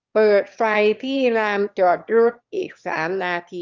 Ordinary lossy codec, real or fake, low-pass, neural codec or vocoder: Opus, 32 kbps; fake; 7.2 kHz; autoencoder, 22.05 kHz, a latent of 192 numbers a frame, VITS, trained on one speaker